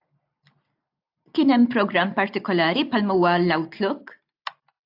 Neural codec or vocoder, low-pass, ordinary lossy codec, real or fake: none; 5.4 kHz; AAC, 48 kbps; real